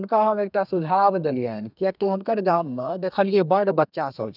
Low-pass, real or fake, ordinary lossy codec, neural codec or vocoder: 5.4 kHz; fake; none; codec, 32 kHz, 1.9 kbps, SNAC